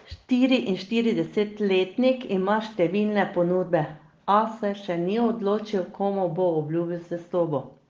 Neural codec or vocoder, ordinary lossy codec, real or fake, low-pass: none; Opus, 16 kbps; real; 7.2 kHz